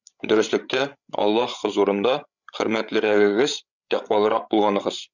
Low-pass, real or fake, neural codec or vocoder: 7.2 kHz; fake; codec, 16 kHz, 8 kbps, FreqCodec, larger model